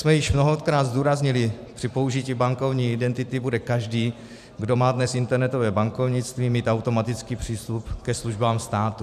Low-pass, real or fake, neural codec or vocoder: 14.4 kHz; fake; vocoder, 44.1 kHz, 128 mel bands every 512 samples, BigVGAN v2